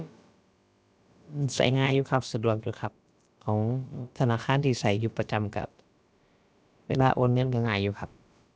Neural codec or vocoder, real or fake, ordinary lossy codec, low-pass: codec, 16 kHz, about 1 kbps, DyCAST, with the encoder's durations; fake; none; none